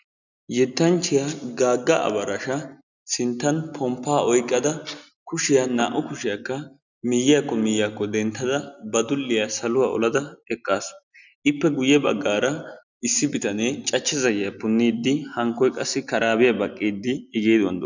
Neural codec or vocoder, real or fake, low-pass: none; real; 7.2 kHz